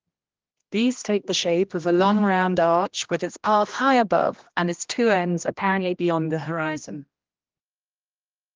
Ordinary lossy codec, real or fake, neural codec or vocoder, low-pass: Opus, 24 kbps; fake; codec, 16 kHz, 1 kbps, X-Codec, HuBERT features, trained on general audio; 7.2 kHz